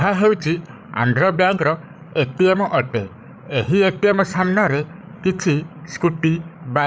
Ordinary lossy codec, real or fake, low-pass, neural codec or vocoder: none; fake; none; codec, 16 kHz, 16 kbps, FreqCodec, larger model